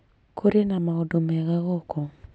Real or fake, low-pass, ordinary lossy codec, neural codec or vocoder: real; none; none; none